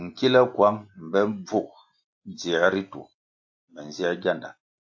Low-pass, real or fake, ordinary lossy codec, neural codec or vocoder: 7.2 kHz; real; AAC, 48 kbps; none